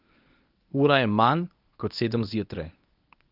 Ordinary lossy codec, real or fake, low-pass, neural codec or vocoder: Opus, 24 kbps; fake; 5.4 kHz; codec, 24 kHz, 0.9 kbps, WavTokenizer, medium speech release version 1